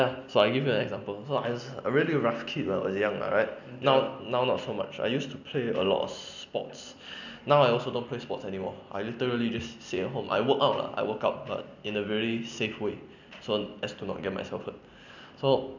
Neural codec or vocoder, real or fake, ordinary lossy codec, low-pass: none; real; none; 7.2 kHz